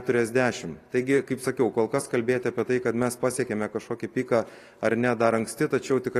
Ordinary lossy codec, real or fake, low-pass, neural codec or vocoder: AAC, 48 kbps; real; 14.4 kHz; none